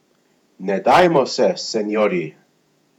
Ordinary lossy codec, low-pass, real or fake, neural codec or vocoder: none; 19.8 kHz; fake; vocoder, 48 kHz, 128 mel bands, Vocos